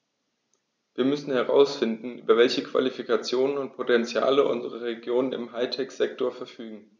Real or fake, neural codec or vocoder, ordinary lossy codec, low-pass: real; none; none; none